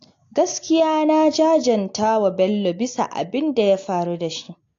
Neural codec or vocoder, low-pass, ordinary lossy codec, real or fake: none; 7.2 kHz; AAC, 48 kbps; real